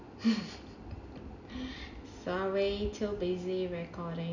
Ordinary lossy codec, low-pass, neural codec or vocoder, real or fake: none; 7.2 kHz; none; real